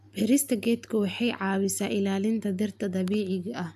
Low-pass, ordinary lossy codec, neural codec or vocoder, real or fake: 14.4 kHz; AAC, 96 kbps; none; real